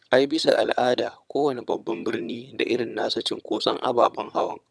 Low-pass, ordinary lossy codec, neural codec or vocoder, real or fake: none; none; vocoder, 22.05 kHz, 80 mel bands, HiFi-GAN; fake